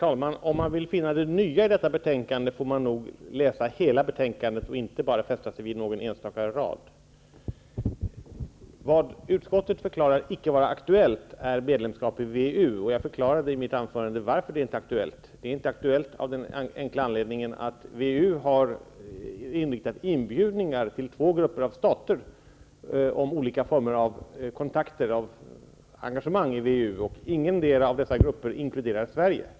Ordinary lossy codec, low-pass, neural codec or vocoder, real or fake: none; none; none; real